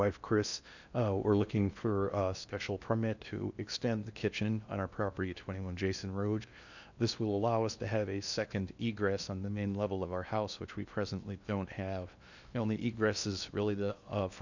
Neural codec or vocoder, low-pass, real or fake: codec, 16 kHz in and 24 kHz out, 0.6 kbps, FocalCodec, streaming, 4096 codes; 7.2 kHz; fake